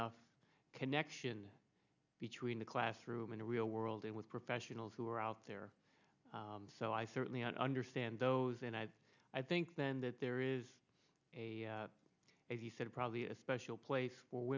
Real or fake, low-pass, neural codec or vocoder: real; 7.2 kHz; none